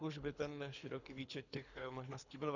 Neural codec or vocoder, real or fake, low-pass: codec, 24 kHz, 3 kbps, HILCodec; fake; 7.2 kHz